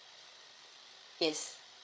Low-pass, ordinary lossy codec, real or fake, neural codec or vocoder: none; none; fake; codec, 16 kHz, 8 kbps, FreqCodec, larger model